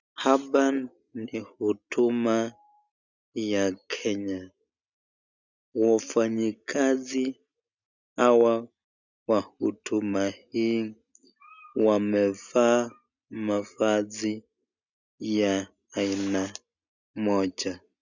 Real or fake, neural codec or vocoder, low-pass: real; none; 7.2 kHz